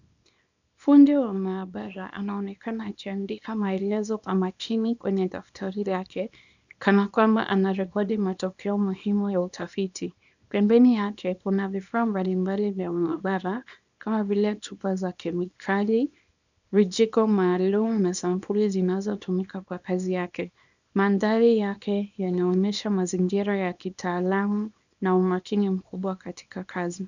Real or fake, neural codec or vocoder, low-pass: fake; codec, 24 kHz, 0.9 kbps, WavTokenizer, small release; 7.2 kHz